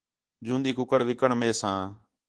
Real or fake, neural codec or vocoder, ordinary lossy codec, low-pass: fake; codec, 24 kHz, 1.2 kbps, DualCodec; Opus, 16 kbps; 10.8 kHz